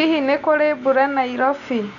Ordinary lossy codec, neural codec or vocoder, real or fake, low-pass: none; none; real; 7.2 kHz